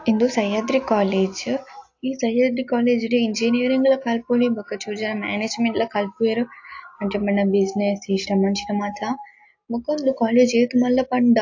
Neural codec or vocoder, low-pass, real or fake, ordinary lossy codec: none; 7.2 kHz; real; AAC, 48 kbps